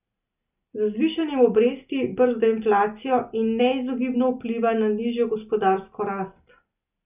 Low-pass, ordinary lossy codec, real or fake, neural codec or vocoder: 3.6 kHz; none; real; none